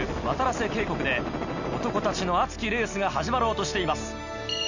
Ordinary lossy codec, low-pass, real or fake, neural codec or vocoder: none; 7.2 kHz; real; none